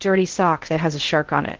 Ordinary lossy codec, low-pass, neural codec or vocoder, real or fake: Opus, 16 kbps; 7.2 kHz; codec, 16 kHz in and 24 kHz out, 0.6 kbps, FocalCodec, streaming, 4096 codes; fake